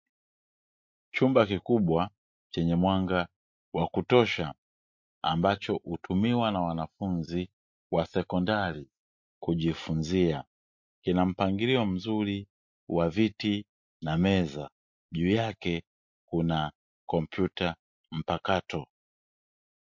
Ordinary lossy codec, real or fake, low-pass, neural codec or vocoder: MP3, 48 kbps; real; 7.2 kHz; none